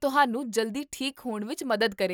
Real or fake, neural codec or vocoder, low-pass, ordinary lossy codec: real; none; 19.8 kHz; none